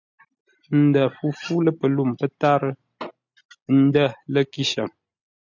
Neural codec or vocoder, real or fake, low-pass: none; real; 7.2 kHz